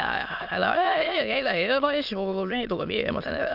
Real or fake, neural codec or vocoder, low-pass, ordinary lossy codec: fake; autoencoder, 22.05 kHz, a latent of 192 numbers a frame, VITS, trained on many speakers; 5.4 kHz; none